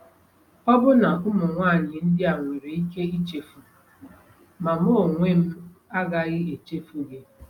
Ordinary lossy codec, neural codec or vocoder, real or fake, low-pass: none; none; real; 19.8 kHz